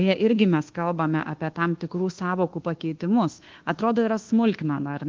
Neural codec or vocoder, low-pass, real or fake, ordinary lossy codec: codec, 24 kHz, 1.2 kbps, DualCodec; 7.2 kHz; fake; Opus, 16 kbps